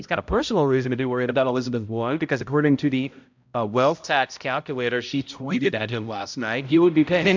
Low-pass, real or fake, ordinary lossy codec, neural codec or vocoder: 7.2 kHz; fake; MP3, 64 kbps; codec, 16 kHz, 0.5 kbps, X-Codec, HuBERT features, trained on balanced general audio